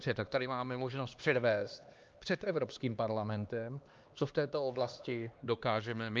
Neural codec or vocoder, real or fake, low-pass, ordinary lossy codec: codec, 16 kHz, 2 kbps, X-Codec, HuBERT features, trained on LibriSpeech; fake; 7.2 kHz; Opus, 24 kbps